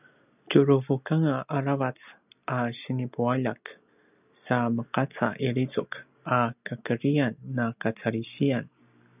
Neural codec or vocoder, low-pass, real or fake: none; 3.6 kHz; real